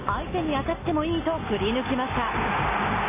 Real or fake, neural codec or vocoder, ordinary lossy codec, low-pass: real; none; MP3, 16 kbps; 3.6 kHz